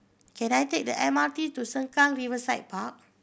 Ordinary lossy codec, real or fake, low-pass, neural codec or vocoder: none; real; none; none